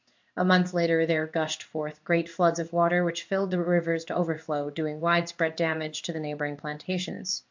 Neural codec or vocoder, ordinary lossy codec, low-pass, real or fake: codec, 16 kHz in and 24 kHz out, 1 kbps, XY-Tokenizer; MP3, 48 kbps; 7.2 kHz; fake